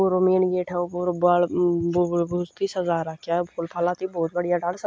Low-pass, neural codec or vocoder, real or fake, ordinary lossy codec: none; none; real; none